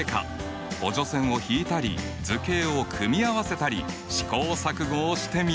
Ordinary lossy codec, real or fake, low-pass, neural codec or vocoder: none; real; none; none